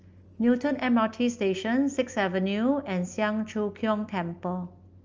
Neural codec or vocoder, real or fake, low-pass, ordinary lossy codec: none; real; 7.2 kHz; Opus, 24 kbps